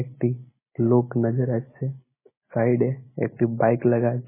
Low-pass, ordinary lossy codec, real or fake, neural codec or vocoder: 3.6 kHz; MP3, 16 kbps; real; none